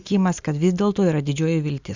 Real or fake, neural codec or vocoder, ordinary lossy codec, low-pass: real; none; Opus, 64 kbps; 7.2 kHz